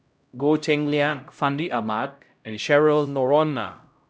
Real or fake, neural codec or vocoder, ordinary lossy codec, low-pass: fake; codec, 16 kHz, 0.5 kbps, X-Codec, HuBERT features, trained on LibriSpeech; none; none